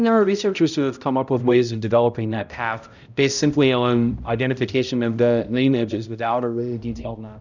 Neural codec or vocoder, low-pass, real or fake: codec, 16 kHz, 0.5 kbps, X-Codec, HuBERT features, trained on balanced general audio; 7.2 kHz; fake